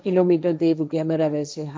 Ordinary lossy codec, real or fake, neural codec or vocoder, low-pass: none; fake; codec, 16 kHz, 1.1 kbps, Voila-Tokenizer; none